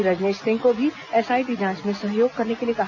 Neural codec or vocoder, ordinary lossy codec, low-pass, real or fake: none; none; 7.2 kHz; real